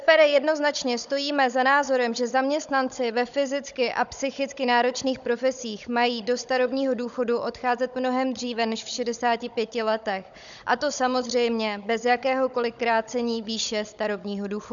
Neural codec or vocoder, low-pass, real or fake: codec, 16 kHz, 16 kbps, FunCodec, trained on Chinese and English, 50 frames a second; 7.2 kHz; fake